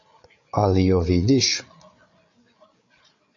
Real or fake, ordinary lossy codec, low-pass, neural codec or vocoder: fake; AAC, 64 kbps; 7.2 kHz; codec, 16 kHz, 8 kbps, FreqCodec, larger model